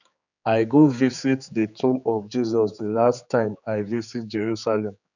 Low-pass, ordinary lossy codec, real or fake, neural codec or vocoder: 7.2 kHz; none; fake; codec, 16 kHz, 4 kbps, X-Codec, HuBERT features, trained on general audio